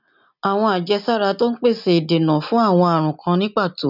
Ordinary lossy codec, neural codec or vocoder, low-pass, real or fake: none; none; 5.4 kHz; real